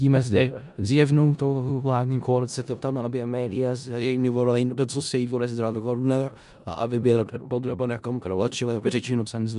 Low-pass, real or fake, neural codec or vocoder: 10.8 kHz; fake; codec, 16 kHz in and 24 kHz out, 0.4 kbps, LongCat-Audio-Codec, four codebook decoder